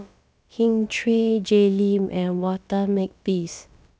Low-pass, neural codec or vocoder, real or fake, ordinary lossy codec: none; codec, 16 kHz, about 1 kbps, DyCAST, with the encoder's durations; fake; none